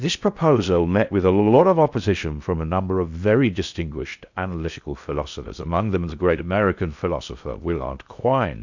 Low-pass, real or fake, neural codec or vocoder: 7.2 kHz; fake; codec, 16 kHz in and 24 kHz out, 0.6 kbps, FocalCodec, streaming, 4096 codes